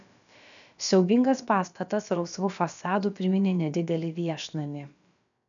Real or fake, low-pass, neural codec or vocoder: fake; 7.2 kHz; codec, 16 kHz, about 1 kbps, DyCAST, with the encoder's durations